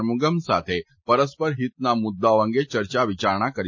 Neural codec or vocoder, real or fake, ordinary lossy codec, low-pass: none; real; MP3, 32 kbps; 7.2 kHz